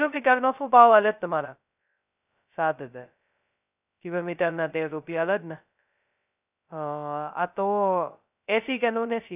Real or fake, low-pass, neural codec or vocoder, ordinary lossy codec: fake; 3.6 kHz; codec, 16 kHz, 0.2 kbps, FocalCodec; none